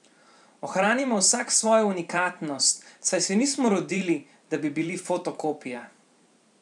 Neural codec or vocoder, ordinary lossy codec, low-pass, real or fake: vocoder, 44.1 kHz, 128 mel bands every 512 samples, BigVGAN v2; none; 10.8 kHz; fake